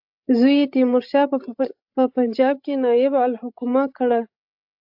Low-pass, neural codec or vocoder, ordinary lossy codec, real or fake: 5.4 kHz; codec, 16 kHz, 16 kbps, FreqCodec, larger model; AAC, 48 kbps; fake